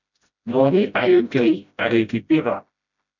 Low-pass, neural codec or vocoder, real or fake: 7.2 kHz; codec, 16 kHz, 0.5 kbps, FreqCodec, smaller model; fake